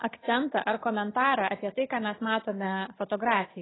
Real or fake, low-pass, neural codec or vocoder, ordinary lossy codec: real; 7.2 kHz; none; AAC, 16 kbps